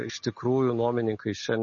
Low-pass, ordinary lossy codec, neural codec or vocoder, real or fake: 7.2 kHz; MP3, 32 kbps; none; real